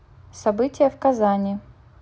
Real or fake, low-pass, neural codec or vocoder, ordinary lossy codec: real; none; none; none